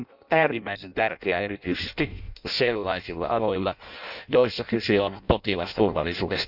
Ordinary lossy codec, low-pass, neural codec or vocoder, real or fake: none; 5.4 kHz; codec, 16 kHz in and 24 kHz out, 0.6 kbps, FireRedTTS-2 codec; fake